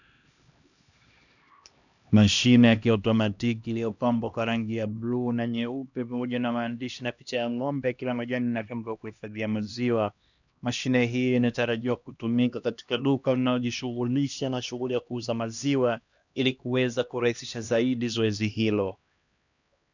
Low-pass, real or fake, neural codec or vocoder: 7.2 kHz; fake; codec, 16 kHz, 1 kbps, X-Codec, HuBERT features, trained on LibriSpeech